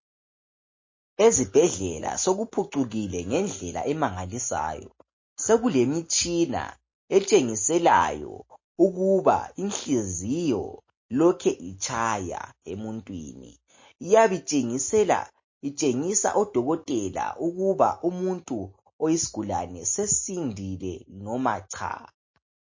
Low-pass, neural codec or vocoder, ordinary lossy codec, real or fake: 7.2 kHz; none; MP3, 32 kbps; real